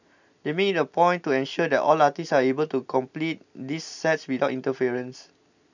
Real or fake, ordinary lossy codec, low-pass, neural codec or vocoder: real; none; 7.2 kHz; none